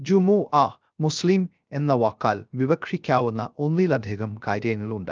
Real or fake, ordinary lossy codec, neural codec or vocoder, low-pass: fake; Opus, 24 kbps; codec, 16 kHz, 0.3 kbps, FocalCodec; 7.2 kHz